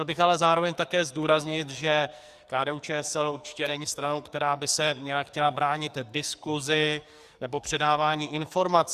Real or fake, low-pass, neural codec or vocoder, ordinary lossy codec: fake; 14.4 kHz; codec, 44.1 kHz, 2.6 kbps, SNAC; Opus, 64 kbps